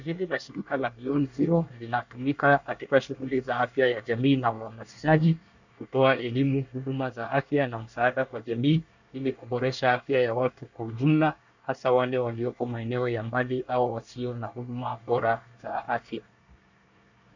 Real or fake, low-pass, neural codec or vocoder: fake; 7.2 kHz; codec, 24 kHz, 1 kbps, SNAC